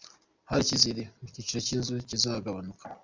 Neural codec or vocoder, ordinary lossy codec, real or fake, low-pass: none; MP3, 64 kbps; real; 7.2 kHz